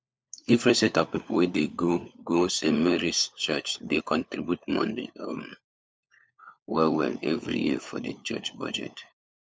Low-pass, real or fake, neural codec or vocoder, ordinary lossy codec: none; fake; codec, 16 kHz, 4 kbps, FunCodec, trained on LibriTTS, 50 frames a second; none